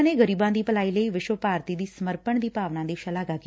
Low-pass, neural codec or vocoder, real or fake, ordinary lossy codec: none; none; real; none